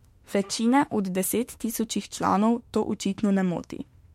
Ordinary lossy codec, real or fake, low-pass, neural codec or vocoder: MP3, 64 kbps; fake; 19.8 kHz; autoencoder, 48 kHz, 32 numbers a frame, DAC-VAE, trained on Japanese speech